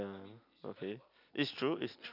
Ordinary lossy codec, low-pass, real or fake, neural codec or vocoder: none; 5.4 kHz; real; none